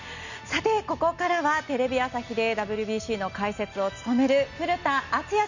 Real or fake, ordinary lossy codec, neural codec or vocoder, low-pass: real; none; none; 7.2 kHz